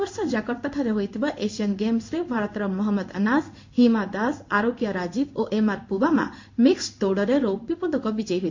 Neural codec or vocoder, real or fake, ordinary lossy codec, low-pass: codec, 16 kHz in and 24 kHz out, 1 kbps, XY-Tokenizer; fake; MP3, 48 kbps; 7.2 kHz